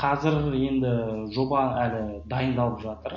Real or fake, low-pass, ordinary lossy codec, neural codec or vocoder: real; 7.2 kHz; MP3, 32 kbps; none